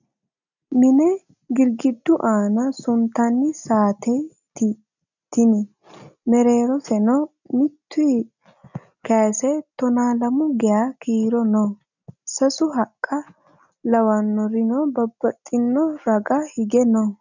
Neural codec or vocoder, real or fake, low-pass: none; real; 7.2 kHz